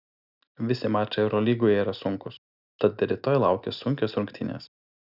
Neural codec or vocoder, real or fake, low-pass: none; real; 5.4 kHz